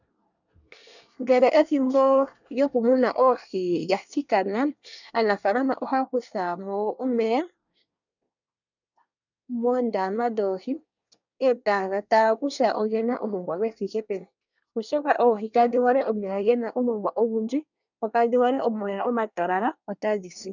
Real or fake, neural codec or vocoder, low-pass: fake; codec, 24 kHz, 1 kbps, SNAC; 7.2 kHz